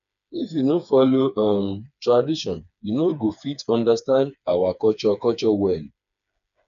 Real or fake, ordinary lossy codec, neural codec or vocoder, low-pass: fake; none; codec, 16 kHz, 4 kbps, FreqCodec, smaller model; 7.2 kHz